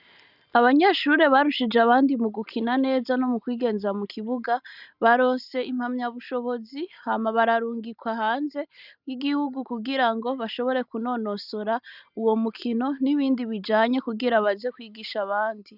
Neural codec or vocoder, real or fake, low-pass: none; real; 5.4 kHz